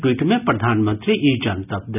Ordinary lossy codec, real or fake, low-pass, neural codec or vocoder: none; real; 3.6 kHz; none